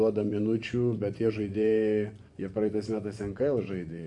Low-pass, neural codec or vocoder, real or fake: 10.8 kHz; vocoder, 24 kHz, 100 mel bands, Vocos; fake